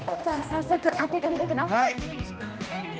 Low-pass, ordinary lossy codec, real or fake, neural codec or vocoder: none; none; fake; codec, 16 kHz, 1 kbps, X-Codec, HuBERT features, trained on balanced general audio